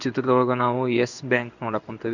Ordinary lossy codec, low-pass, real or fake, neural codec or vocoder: none; 7.2 kHz; fake; codec, 16 kHz in and 24 kHz out, 1 kbps, XY-Tokenizer